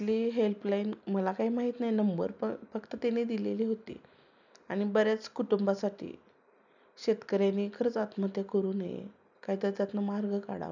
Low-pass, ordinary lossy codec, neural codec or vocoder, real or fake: 7.2 kHz; none; none; real